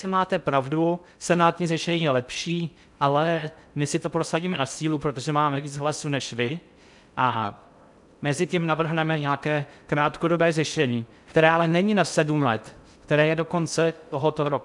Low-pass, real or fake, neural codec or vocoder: 10.8 kHz; fake; codec, 16 kHz in and 24 kHz out, 0.6 kbps, FocalCodec, streaming, 2048 codes